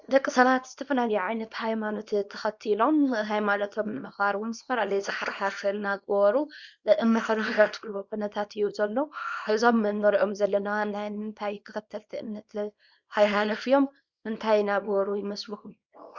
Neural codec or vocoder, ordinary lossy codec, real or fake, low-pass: codec, 24 kHz, 0.9 kbps, WavTokenizer, small release; Opus, 64 kbps; fake; 7.2 kHz